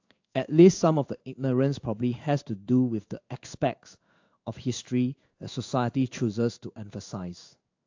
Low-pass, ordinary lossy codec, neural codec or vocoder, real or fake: 7.2 kHz; AAC, 48 kbps; codec, 16 kHz in and 24 kHz out, 1 kbps, XY-Tokenizer; fake